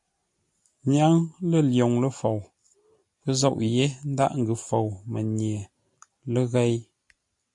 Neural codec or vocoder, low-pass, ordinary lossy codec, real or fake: none; 10.8 kHz; AAC, 64 kbps; real